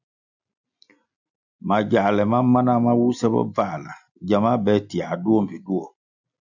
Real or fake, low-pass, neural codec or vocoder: real; 7.2 kHz; none